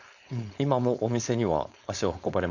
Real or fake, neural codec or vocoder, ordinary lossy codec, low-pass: fake; codec, 16 kHz, 4.8 kbps, FACodec; none; 7.2 kHz